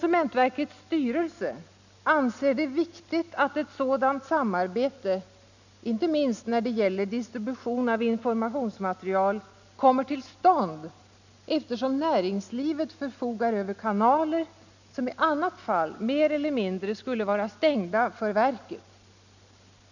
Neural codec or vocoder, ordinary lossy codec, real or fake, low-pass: none; none; real; 7.2 kHz